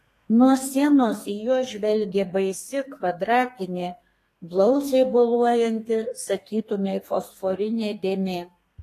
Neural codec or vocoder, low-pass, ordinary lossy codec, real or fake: codec, 32 kHz, 1.9 kbps, SNAC; 14.4 kHz; AAC, 48 kbps; fake